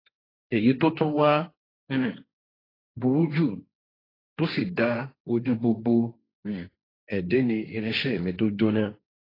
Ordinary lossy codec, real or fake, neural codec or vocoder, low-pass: AAC, 24 kbps; fake; codec, 16 kHz, 1.1 kbps, Voila-Tokenizer; 5.4 kHz